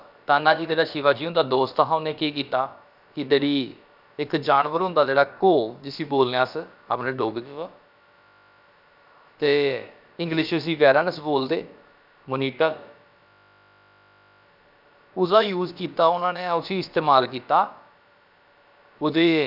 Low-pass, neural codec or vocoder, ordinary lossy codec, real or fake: 5.4 kHz; codec, 16 kHz, about 1 kbps, DyCAST, with the encoder's durations; none; fake